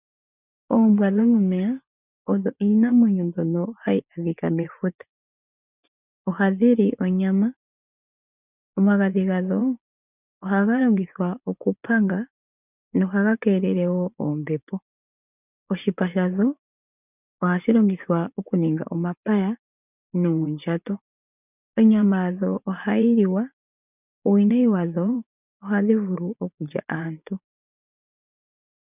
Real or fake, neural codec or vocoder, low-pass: fake; vocoder, 24 kHz, 100 mel bands, Vocos; 3.6 kHz